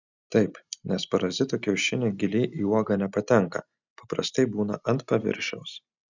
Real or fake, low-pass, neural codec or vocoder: real; 7.2 kHz; none